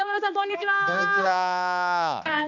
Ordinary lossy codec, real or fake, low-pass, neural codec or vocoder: none; fake; 7.2 kHz; codec, 16 kHz, 2 kbps, X-Codec, HuBERT features, trained on balanced general audio